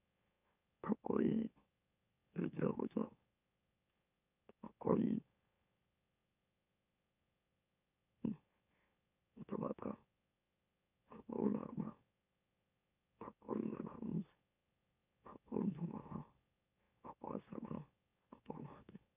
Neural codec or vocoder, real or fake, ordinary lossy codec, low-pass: autoencoder, 44.1 kHz, a latent of 192 numbers a frame, MeloTTS; fake; none; 3.6 kHz